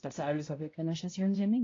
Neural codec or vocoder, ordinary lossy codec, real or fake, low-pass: codec, 16 kHz, 0.5 kbps, X-Codec, HuBERT features, trained on balanced general audio; AAC, 32 kbps; fake; 7.2 kHz